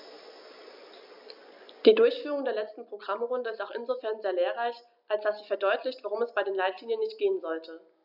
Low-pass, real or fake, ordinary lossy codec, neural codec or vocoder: 5.4 kHz; real; none; none